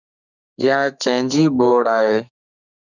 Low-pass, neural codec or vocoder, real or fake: 7.2 kHz; codec, 32 kHz, 1.9 kbps, SNAC; fake